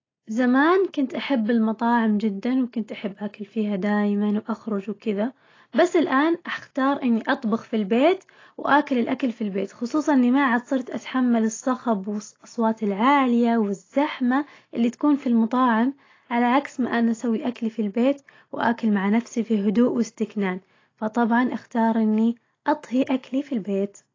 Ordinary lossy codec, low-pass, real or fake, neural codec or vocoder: AAC, 32 kbps; 7.2 kHz; real; none